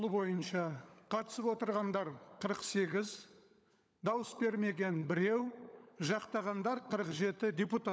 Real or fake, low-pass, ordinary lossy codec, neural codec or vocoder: fake; none; none; codec, 16 kHz, 16 kbps, FunCodec, trained on LibriTTS, 50 frames a second